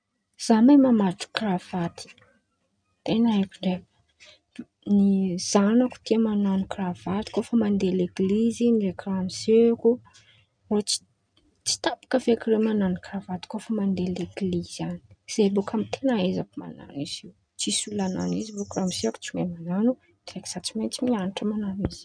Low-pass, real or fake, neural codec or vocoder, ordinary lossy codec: 9.9 kHz; real; none; none